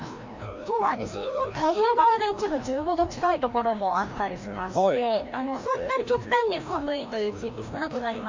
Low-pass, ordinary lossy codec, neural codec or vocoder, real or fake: 7.2 kHz; MP3, 48 kbps; codec, 16 kHz, 1 kbps, FreqCodec, larger model; fake